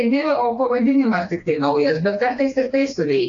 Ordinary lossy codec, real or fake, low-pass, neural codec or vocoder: AAC, 48 kbps; fake; 7.2 kHz; codec, 16 kHz, 2 kbps, FreqCodec, smaller model